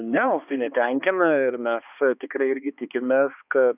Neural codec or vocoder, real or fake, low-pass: codec, 16 kHz, 2 kbps, X-Codec, HuBERT features, trained on balanced general audio; fake; 3.6 kHz